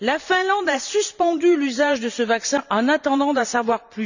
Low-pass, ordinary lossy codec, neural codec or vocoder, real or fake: 7.2 kHz; none; vocoder, 44.1 kHz, 128 mel bands every 512 samples, BigVGAN v2; fake